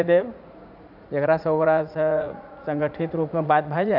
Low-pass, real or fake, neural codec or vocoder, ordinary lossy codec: 5.4 kHz; fake; vocoder, 44.1 kHz, 80 mel bands, Vocos; none